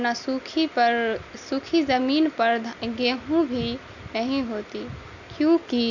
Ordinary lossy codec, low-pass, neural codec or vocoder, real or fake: none; 7.2 kHz; none; real